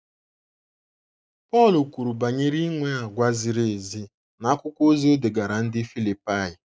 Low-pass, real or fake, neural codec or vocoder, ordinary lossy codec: none; real; none; none